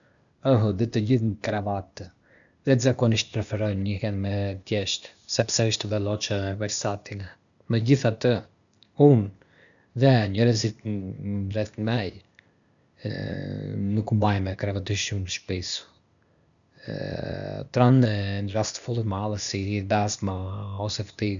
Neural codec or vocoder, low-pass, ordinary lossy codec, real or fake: codec, 16 kHz, 0.8 kbps, ZipCodec; 7.2 kHz; none; fake